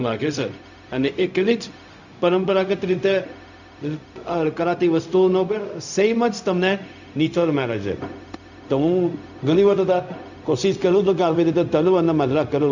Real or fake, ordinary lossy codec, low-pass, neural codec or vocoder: fake; none; 7.2 kHz; codec, 16 kHz, 0.4 kbps, LongCat-Audio-Codec